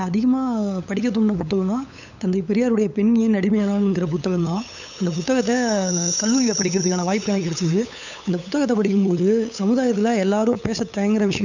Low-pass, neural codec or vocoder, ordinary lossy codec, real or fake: 7.2 kHz; codec, 16 kHz, 8 kbps, FunCodec, trained on LibriTTS, 25 frames a second; none; fake